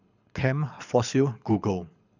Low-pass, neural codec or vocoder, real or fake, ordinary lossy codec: 7.2 kHz; codec, 24 kHz, 6 kbps, HILCodec; fake; none